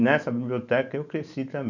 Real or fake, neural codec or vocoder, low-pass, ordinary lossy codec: real; none; 7.2 kHz; none